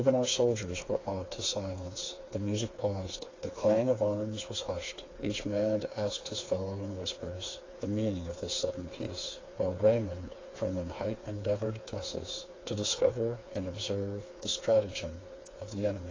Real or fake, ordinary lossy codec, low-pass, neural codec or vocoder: fake; AAC, 32 kbps; 7.2 kHz; codec, 16 kHz, 4 kbps, FreqCodec, smaller model